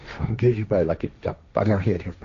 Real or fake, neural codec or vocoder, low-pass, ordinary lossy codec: fake; codec, 16 kHz, 1.1 kbps, Voila-Tokenizer; 7.2 kHz; AAC, 48 kbps